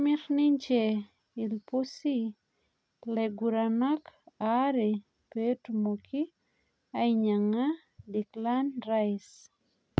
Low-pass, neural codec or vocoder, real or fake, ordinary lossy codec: none; none; real; none